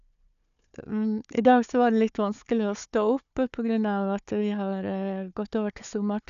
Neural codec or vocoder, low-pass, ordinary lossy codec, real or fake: codec, 16 kHz, 4 kbps, FunCodec, trained on Chinese and English, 50 frames a second; 7.2 kHz; none; fake